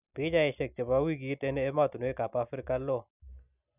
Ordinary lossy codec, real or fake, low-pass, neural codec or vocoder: none; real; 3.6 kHz; none